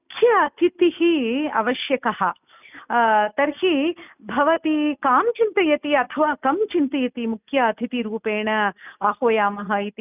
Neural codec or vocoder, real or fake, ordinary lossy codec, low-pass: none; real; none; 3.6 kHz